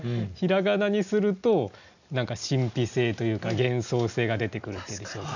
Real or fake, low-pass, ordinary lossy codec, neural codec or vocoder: real; 7.2 kHz; none; none